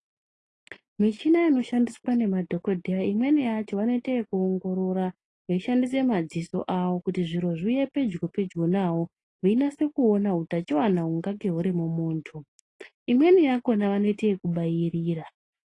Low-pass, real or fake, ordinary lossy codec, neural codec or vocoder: 10.8 kHz; real; AAC, 32 kbps; none